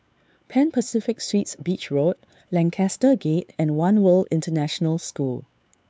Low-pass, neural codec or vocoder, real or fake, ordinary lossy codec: none; codec, 16 kHz, 4 kbps, X-Codec, WavLM features, trained on Multilingual LibriSpeech; fake; none